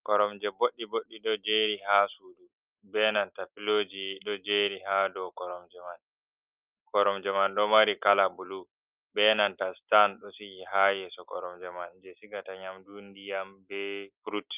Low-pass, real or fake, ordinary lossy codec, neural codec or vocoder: 3.6 kHz; real; Opus, 24 kbps; none